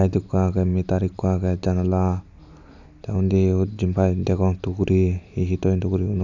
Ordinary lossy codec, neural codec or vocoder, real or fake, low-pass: none; none; real; 7.2 kHz